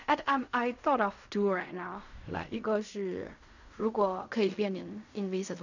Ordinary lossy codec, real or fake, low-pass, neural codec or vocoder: none; fake; 7.2 kHz; codec, 16 kHz in and 24 kHz out, 0.4 kbps, LongCat-Audio-Codec, fine tuned four codebook decoder